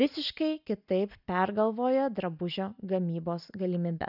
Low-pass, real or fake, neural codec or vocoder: 5.4 kHz; real; none